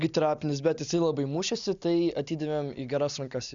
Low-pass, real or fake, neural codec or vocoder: 7.2 kHz; real; none